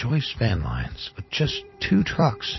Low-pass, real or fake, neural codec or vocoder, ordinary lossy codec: 7.2 kHz; fake; vocoder, 44.1 kHz, 128 mel bands every 256 samples, BigVGAN v2; MP3, 24 kbps